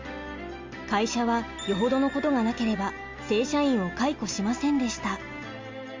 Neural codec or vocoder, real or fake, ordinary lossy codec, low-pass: none; real; Opus, 32 kbps; 7.2 kHz